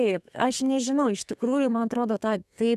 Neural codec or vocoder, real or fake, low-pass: codec, 32 kHz, 1.9 kbps, SNAC; fake; 14.4 kHz